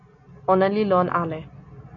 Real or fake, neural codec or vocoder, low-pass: real; none; 7.2 kHz